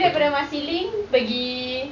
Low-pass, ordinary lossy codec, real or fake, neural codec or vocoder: 7.2 kHz; none; real; none